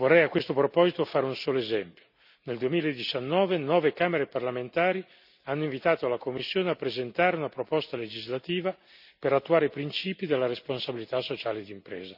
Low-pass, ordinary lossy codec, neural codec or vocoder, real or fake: 5.4 kHz; none; none; real